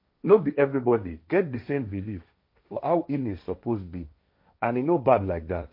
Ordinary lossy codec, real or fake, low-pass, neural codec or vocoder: MP3, 32 kbps; fake; 5.4 kHz; codec, 16 kHz, 1.1 kbps, Voila-Tokenizer